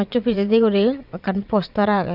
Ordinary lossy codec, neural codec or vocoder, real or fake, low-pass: none; vocoder, 22.05 kHz, 80 mel bands, WaveNeXt; fake; 5.4 kHz